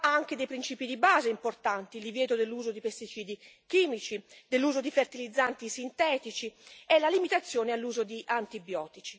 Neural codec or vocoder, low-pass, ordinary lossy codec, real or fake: none; none; none; real